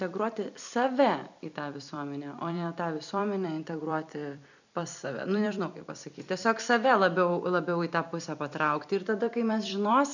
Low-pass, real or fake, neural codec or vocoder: 7.2 kHz; fake; vocoder, 44.1 kHz, 128 mel bands every 256 samples, BigVGAN v2